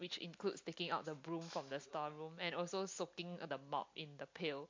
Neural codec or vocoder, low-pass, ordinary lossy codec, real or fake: none; 7.2 kHz; MP3, 48 kbps; real